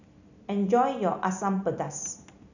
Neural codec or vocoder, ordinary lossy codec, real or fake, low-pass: none; none; real; 7.2 kHz